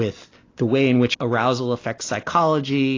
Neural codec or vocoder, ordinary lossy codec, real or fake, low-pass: none; AAC, 32 kbps; real; 7.2 kHz